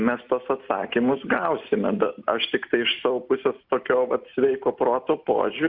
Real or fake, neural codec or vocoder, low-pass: real; none; 5.4 kHz